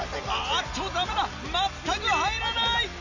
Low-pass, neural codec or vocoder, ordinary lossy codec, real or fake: 7.2 kHz; none; none; real